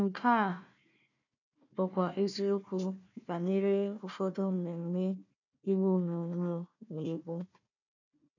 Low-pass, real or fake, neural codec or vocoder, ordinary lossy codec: 7.2 kHz; fake; codec, 16 kHz, 1 kbps, FunCodec, trained on Chinese and English, 50 frames a second; none